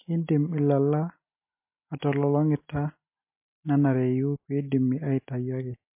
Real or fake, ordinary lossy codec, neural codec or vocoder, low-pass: real; MP3, 24 kbps; none; 3.6 kHz